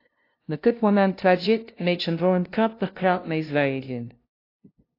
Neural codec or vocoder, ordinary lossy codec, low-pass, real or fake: codec, 16 kHz, 0.5 kbps, FunCodec, trained on LibriTTS, 25 frames a second; AAC, 32 kbps; 5.4 kHz; fake